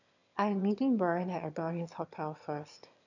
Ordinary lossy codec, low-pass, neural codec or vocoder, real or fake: none; 7.2 kHz; autoencoder, 22.05 kHz, a latent of 192 numbers a frame, VITS, trained on one speaker; fake